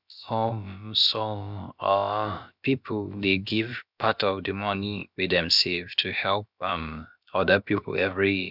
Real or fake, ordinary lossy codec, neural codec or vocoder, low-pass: fake; none; codec, 16 kHz, about 1 kbps, DyCAST, with the encoder's durations; 5.4 kHz